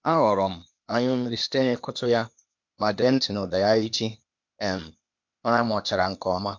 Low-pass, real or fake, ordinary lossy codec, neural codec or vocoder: 7.2 kHz; fake; MP3, 48 kbps; codec, 16 kHz, 0.8 kbps, ZipCodec